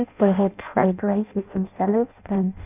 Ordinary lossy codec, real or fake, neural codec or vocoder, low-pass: none; fake; codec, 16 kHz in and 24 kHz out, 0.6 kbps, FireRedTTS-2 codec; 3.6 kHz